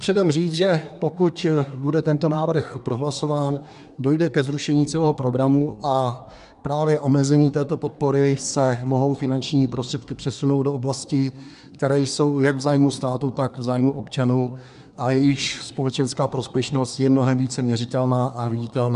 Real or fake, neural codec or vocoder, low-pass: fake; codec, 24 kHz, 1 kbps, SNAC; 10.8 kHz